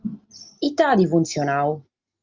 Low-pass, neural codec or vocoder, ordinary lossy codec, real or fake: 7.2 kHz; none; Opus, 24 kbps; real